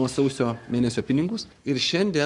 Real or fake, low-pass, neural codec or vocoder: fake; 10.8 kHz; codec, 44.1 kHz, 7.8 kbps, Pupu-Codec